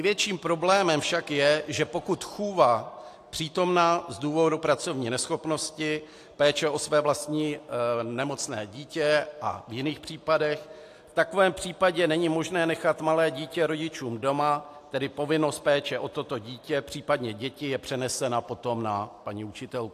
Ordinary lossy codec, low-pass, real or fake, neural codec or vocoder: AAC, 64 kbps; 14.4 kHz; real; none